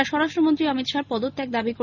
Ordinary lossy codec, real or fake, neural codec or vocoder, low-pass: none; real; none; 7.2 kHz